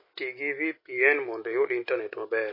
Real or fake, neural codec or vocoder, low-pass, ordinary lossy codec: real; none; 5.4 kHz; MP3, 24 kbps